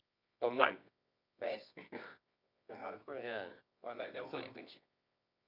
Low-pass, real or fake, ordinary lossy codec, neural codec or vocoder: 5.4 kHz; fake; none; codec, 24 kHz, 0.9 kbps, WavTokenizer, medium music audio release